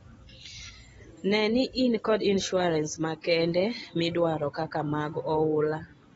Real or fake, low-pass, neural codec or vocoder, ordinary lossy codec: real; 19.8 kHz; none; AAC, 24 kbps